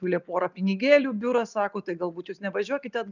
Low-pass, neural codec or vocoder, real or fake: 7.2 kHz; none; real